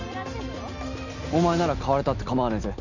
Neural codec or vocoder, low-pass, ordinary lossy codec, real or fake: none; 7.2 kHz; none; real